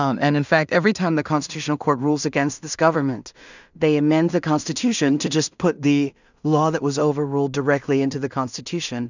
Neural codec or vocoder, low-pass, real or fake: codec, 16 kHz in and 24 kHz out, 0.4 kbps, LongCat-Audio-Codec, two codebook decoder; 7.2 kHz; fake